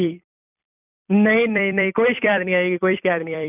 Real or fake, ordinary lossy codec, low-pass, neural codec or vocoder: fake; none; 3.6 kHz; vocoder, 44.1 kHz, 128 mel bands every 256 samples, BigVGAN v2